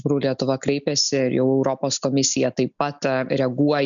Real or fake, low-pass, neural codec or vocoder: real; 7.2 kHz; none